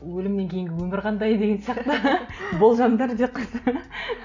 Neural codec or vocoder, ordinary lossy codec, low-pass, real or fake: none; none; 7.2 kHz; real